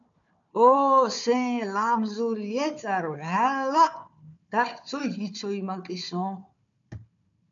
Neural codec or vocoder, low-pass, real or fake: codec, 16 kHz, 4 kbps, FunCodec, trained on Chinese and English, 50 frames a second; 7.2 kHz; fake